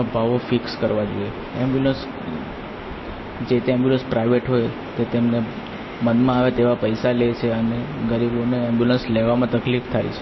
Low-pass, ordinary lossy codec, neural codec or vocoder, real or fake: 7.2 kHz; MP3, 24 kbps; none; real